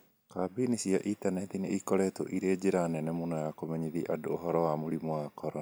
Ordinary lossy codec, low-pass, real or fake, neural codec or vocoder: none; none; real; none